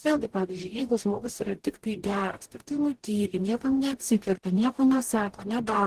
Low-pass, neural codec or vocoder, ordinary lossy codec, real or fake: 14.4 kHz; codec, 44.1 kHz, 0.9 kbps, DAC; Opus, 16 kbps; fake